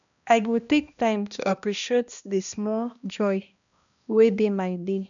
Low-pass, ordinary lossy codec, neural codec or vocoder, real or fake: 7.2 kHz; none; codec, 16 kHz, 1 kbps, X-Codec, HuBERT features, trained on balanced general audio; fake